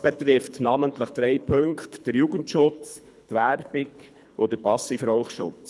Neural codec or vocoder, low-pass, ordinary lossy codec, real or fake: codec, 24 kHz, 3 kbps, HILCodec; none; none; fake